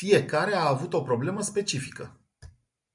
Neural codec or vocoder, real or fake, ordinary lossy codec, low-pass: none; real; MP3, 96 kbps; 10.8 kHz